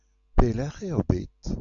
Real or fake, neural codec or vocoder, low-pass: real; none; 7.2 kHz